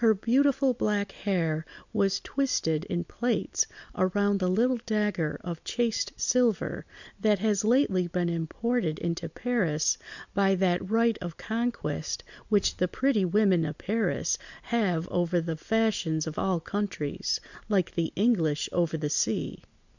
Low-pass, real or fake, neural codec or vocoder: 7.2 kHz; real; none